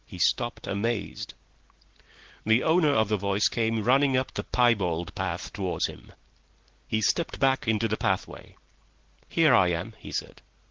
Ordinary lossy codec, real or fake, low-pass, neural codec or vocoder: Opus, 16 kbps; real; 7.2 kHz; none